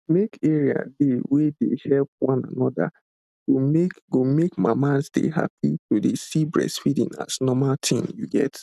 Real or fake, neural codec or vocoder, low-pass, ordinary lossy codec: real; none; 14.4 kHz; none